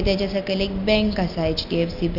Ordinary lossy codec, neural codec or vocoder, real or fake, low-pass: none; none; real; 5.4 kHz